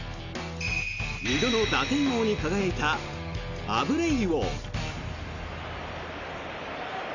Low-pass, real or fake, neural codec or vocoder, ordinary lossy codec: 7.2 kHz; real; none; none